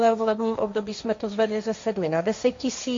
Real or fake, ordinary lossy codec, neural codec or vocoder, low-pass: fake; MP3, 48 kbps; codec, 16 kHz, 1.1 kbps, Voila-Tokenizer; 7.2 kHz